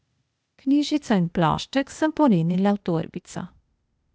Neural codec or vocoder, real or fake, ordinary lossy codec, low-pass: codec, 16 kHz, 0.8 kbps, ZipCodec; fake; none; none